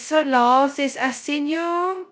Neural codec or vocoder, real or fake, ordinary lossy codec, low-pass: codec, 16 kHz, 0.2 kbps, FocalCodec; fake; none; none